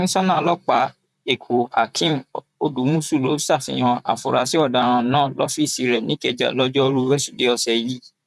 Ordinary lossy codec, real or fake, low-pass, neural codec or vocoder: none; fake; 14.4 kHz; vocoder, 44.1 kHz, 128 mel bands, Pupu-Vocoder